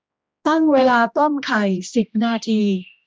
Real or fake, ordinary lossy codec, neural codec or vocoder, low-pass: fake; none; codec, 16 kHz, 1 kbps, X-Codec, HuBERT features, trained on general audio; none